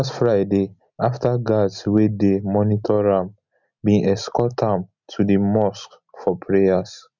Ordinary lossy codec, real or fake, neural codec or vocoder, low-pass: none; real; none; 7.2 kHz